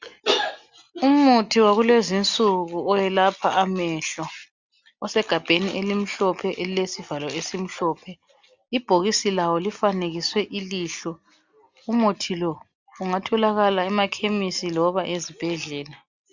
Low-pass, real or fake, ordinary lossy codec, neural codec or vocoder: 7.2 kHz; real; Opus, 64 kbps; none